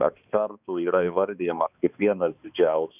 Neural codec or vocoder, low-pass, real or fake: codec, 16 kHz, 2 kbps, X-Codec, HuBERT features, trained on balanced general audio; 3.6 kHz; fake